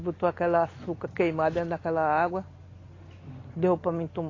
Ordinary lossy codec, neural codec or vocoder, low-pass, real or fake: AAC, 32 kbps; none; 7.2 kHz; real